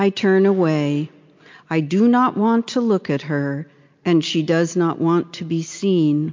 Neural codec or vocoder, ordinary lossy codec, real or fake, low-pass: none; MP3, 48 kbps; real; 7.2 kHz